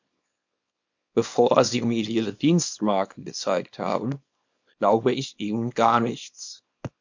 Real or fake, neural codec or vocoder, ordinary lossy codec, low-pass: fake; codec, 24 kHz, 0.9 kbps, WavTokenizer, small release; MP3, 48 kbps; 7.2 kHz